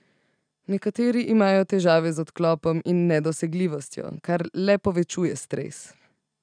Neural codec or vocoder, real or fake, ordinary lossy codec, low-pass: none; real; none; 9.9 kHz